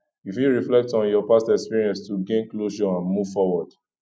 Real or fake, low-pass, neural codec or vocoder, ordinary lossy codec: real; none; none; none